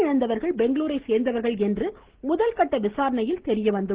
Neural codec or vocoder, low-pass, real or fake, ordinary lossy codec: none; 3.6 kHz; real; Opus, 16 kbps